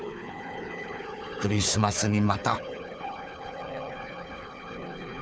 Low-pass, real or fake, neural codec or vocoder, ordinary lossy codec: none; fake; codec, 16 kHz, 4 kbps, FunCodec, trained on Chinese and English, 50 frames a second; none